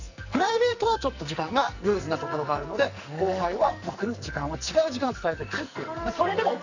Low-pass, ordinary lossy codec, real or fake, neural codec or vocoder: 7.2 kHz; none; fake; codec, 44.1 kHz, 2.6 kbps, SNAC